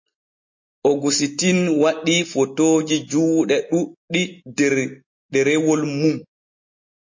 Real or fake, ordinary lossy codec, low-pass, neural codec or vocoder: real; MP3, 32 kbps; 7.2 kHz; none